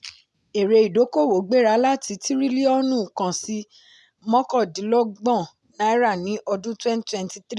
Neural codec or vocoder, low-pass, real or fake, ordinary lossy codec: none; none; real; none